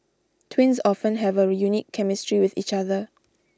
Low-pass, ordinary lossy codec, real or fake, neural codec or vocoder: none; none; real; none